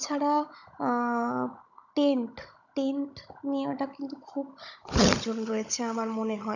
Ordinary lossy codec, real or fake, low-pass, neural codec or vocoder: none; fake; 7.2 kHz; codec, 16 kHz, 16 kbps, FunCodec, trained on Chinese and English, 50 frames a second